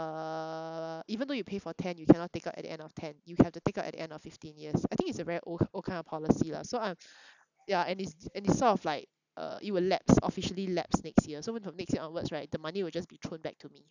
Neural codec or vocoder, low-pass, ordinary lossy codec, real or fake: none; 7.2 kHz; none; real